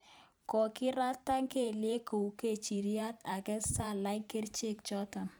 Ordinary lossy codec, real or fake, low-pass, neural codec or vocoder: none; fake; none; vocoder, 44.1 kHz, 128 mel bands every 512 samples, BigVGAN v2